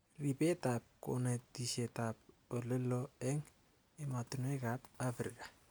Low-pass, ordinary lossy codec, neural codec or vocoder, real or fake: none; none; none; real